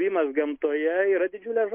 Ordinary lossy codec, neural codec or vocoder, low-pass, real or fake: MP3, 32 kbps; none; 3.6 kHz; real